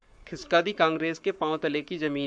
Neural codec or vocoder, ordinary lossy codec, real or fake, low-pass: codec, 44.1 kHz, 7.8 kbps, Pupu-Codec; MP3, 96 kbps; fake; 9.9 kHz